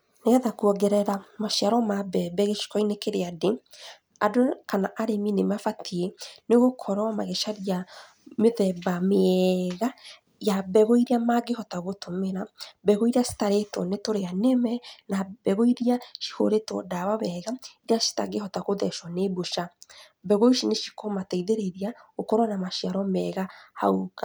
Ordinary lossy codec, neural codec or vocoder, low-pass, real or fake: none; none; none; real